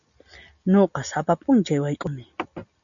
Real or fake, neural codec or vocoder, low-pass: real; none; 7.2 kHz